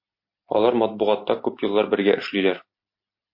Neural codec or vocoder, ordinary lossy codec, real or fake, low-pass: none; MP3, 32 kbps; real; 5.4 kHz